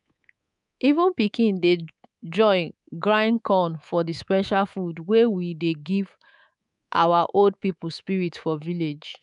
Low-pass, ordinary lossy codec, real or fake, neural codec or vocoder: 10.8 kHz; none; fake; codec, 24 kHz, 3.1 kbps, DualCodec